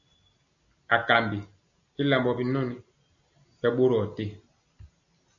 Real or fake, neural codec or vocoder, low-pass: real; none; 7.2 kHz